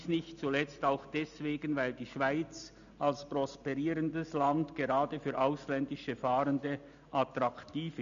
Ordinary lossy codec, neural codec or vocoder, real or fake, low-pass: none; none; real; 7.2 kHz